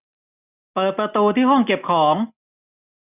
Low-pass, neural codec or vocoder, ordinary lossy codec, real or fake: 3.6 kHz; none; none; real